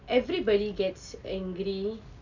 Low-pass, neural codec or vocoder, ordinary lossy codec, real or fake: 7.2 kHz; none; none; real